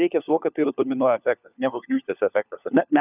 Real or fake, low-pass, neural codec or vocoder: fake; 3.6 kHz; codec, 16 kHz, 4 kbps, FunCodec, trained on LibriTTS, 50 frames a second